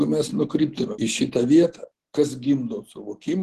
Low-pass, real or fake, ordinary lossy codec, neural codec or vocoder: 14.4 kHz; real; Opus, 16 kbps; none